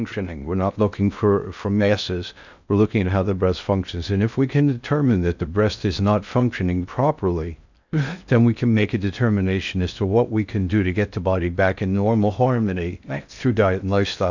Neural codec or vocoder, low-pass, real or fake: codec, 16 kHz in and 24 kHz out, 0.6 kbps, FocalCodec, streaming, 2048 codes; 7.2 kHz; fake